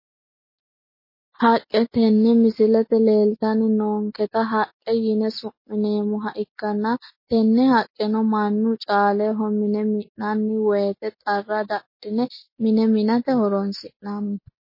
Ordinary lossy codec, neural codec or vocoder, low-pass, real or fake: MP3, 24 kbps; none; 5.4 kHz; real